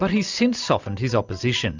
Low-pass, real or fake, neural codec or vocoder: 7.2 kHz; real; none